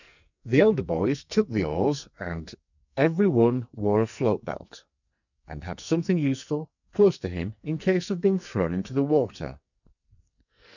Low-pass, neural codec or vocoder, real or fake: 7.2 kHz; codec, 44.1 kHz, 2.6 kbps, SNAC; fake